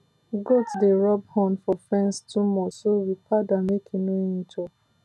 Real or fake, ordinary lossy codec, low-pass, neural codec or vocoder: real; none; none; none